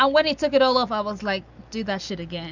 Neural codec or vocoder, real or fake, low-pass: none; real; 7.2 kHz